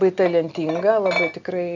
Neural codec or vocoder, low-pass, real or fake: none; 7.2 kHz; real